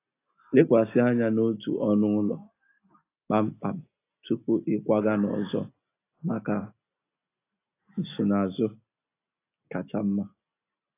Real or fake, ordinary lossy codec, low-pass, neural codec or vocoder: real; AAC, 24 kbps; 3.6 kHz; none